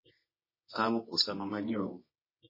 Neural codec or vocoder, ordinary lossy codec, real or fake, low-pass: codec, 24 kHz, 0.9 kbps, WavTokenizer, medium music audio release; MP3, 24 kbps; fake; 5.4 kHz